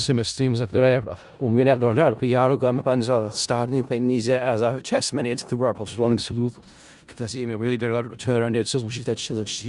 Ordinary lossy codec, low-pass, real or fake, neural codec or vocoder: Opus, 64 kbps; 10.8 kHz; fake; codec, 16 kHz in and 24 kHz out, 0.4 kbps, LongCat-Audio-Codec, four codebook decoder